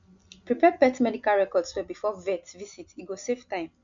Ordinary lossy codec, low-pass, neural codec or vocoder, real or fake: MP3, 64 kbps; 7.2 kHz; none; real